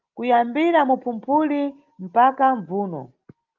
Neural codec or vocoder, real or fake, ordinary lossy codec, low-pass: none; real; Opus, 32 kbps; 7.2 kHz